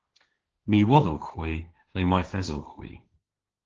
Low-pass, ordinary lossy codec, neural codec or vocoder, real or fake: 7.2 kHz; Opus, 16 kbps; codec, 16 kHz, 1.1 kbps, Voila-Tokenizer; fake